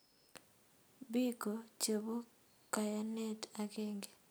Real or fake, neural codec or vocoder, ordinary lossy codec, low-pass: real; none; none; none